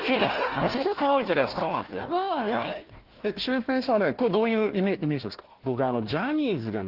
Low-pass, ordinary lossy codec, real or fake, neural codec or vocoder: 5.4 kHz; Opus, 16 kbps; fake; codec, 16 kHz, 1 kbps, FunCodec, trained on Chinese and English, 50 frames a second